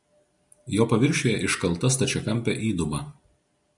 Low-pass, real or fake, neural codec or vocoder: 10.8 kHz; real; none